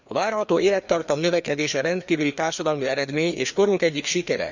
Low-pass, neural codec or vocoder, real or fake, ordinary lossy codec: 7.2 kHz; codec, 16 kHz, 2 kbps, FreqCodec, larger model; fake; none